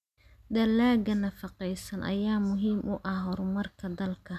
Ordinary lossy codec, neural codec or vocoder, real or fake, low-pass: none; none; real; 14.4 kHz